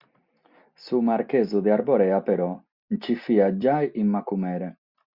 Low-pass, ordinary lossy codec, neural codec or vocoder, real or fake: 5.4 kHz; Opus, 64 kbps; none; real